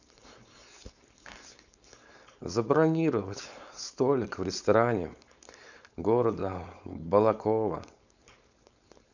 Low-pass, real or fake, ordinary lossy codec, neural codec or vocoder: 7.2 kHz; fake; none; codec, 16 kHz, 4.8 kbps, FACodec